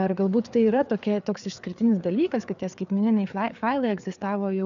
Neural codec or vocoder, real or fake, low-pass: codec, 16 kHz, 4 kbps, FreqCodec, larger model; fake; 7.2 kHz